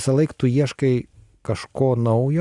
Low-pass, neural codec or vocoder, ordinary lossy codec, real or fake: 10.8 kHz; none; Opus, 64 kbps; real